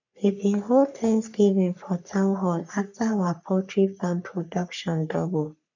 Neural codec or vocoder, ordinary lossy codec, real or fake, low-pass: codec, 44.1 kHz, 3.4 kbps, Pupu-Codec; none; fake; 7.2 kHz